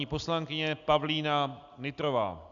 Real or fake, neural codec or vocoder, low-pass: real; none; 7.2 kHz